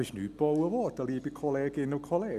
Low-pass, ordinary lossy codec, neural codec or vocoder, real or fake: 14.4 kHz; none; none; real